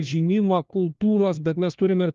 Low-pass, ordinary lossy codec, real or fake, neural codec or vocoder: 7.2 kHz; Opus, 32 kbps; fake; codec, 16 kHz, 1 kbps, FunCodec, trained on LibriTTS, 50 frames a second